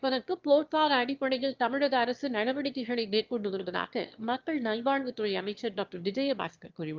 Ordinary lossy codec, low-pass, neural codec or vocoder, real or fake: Opus, 32 kbps; 7.2 kHz; autoencoder, 22.05 kHz, a latent of 192 numbers a frame, VITS, trained on one speaker; fake